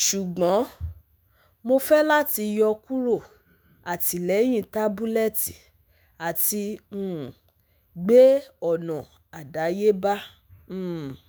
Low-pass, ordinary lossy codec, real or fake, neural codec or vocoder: none; none; fake; autoencoder, 48 kHz, 128 numbers a frame, DAC-VAE, trained on Japanese speech